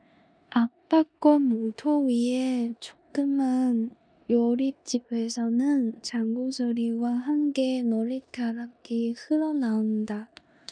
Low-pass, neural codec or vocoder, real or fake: 9.9 kHz; codec, 16 kHz in and 24 kHz out, 0.9 kbps, LongCat-Audio-Codec, four codebook decoder; fake